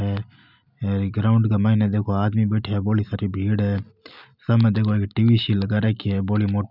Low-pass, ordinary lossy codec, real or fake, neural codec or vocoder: 5.4 kHz; none; real; none